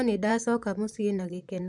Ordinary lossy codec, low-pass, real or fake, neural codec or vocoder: none; 10.8 kHz; fake; vocoder, 44.1 kHz, 128 mel bands, Pupu-Vocoder